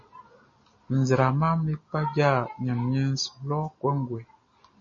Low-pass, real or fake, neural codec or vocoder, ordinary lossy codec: 7.2 kHz; real; none; MP3, 32 kbps